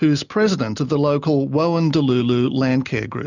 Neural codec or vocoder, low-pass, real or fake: none; 7.2 kHz; real